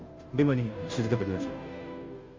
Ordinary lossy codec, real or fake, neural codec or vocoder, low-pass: Opus, 32 kbps; fake; codec, 16 kHz, 0.5 kbps, FunCodec, trained on Chinese and English, 25 frames a second; 7.2 kHz